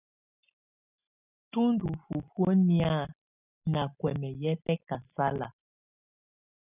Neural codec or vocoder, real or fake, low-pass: none; real; 3.6 kHz